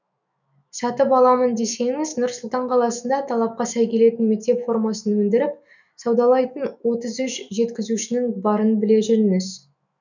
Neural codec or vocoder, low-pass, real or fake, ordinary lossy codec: autoencoder, 48 kHz, 128 numbers a frame, DAC-VAE, trained on Japanese speech; 7.2 kHz; fake; none